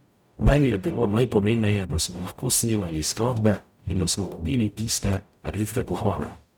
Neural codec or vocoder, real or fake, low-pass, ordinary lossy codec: codec, 44.1 kHz, 0.9 kbps, DAC; fake; none; none